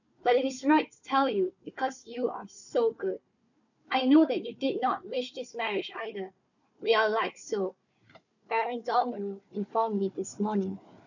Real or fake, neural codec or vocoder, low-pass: fake; codec, 16 kHz, 4 kbps, FunCodec, trained on Chinese and English, 50 frames a second; 7.2 kHz